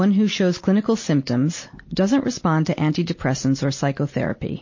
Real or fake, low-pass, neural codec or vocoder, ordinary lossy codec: real; 7.2 kHz; none; MP3, 32 kbps